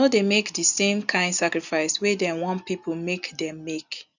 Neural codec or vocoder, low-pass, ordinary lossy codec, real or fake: none; 7.2 kHz; none; real